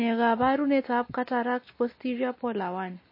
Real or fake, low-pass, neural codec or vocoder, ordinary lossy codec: real; 5.4 kHz; none; MP3, 24 kbps